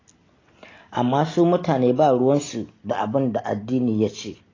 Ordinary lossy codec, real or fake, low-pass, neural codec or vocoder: AAC, 32 kbps; real; 7.2 kHz; none